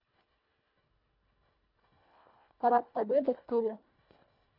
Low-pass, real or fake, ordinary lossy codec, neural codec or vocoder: 5.4 kHz; fake; none; codec, 24 kHz, 1.5 kbps, HILCodec